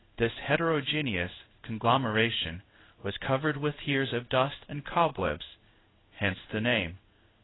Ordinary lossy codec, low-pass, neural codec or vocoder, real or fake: AAC, 16 kbps; 7.2 kHz; codec, 16 kHz in and 24 kHz out, 1 kbps, XY-Tokenizer; fake